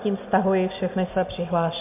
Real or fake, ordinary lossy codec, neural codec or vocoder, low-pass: real; MP3, 32 kbps; none; 3.6 kHz